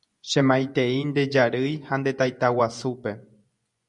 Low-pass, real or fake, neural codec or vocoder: 10.8 kHz; fake; vocoder, 24 kHz, 100 mel bands, Vocos